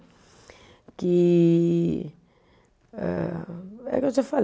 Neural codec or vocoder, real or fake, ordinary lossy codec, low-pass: none; real; none; none